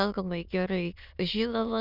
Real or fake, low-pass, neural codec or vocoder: fake; 5.4 kHz; autoencoder, 22.05 kHz, a latent of 192 numbers a frame, VITS, trained on many speakers